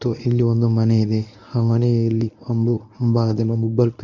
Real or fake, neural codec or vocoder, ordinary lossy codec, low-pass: fake; codec, 24 kHz, 0.9 kbps, WavTokenizer, medium speech release version 1; none; 7.2 kHz